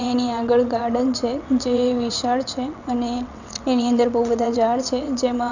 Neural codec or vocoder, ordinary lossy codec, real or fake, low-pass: vocoder, 22.05 kHz, 80 mel bands, WaveNeXt; none; fake; 7.2 kHz